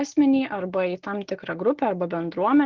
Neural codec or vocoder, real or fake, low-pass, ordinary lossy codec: none; real; 7.2 kHz; Opus, 16 kbps